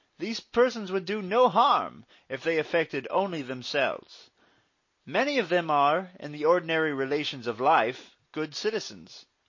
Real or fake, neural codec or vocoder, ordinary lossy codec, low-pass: real; none; MP3, 32 kbps; 7.2 kHz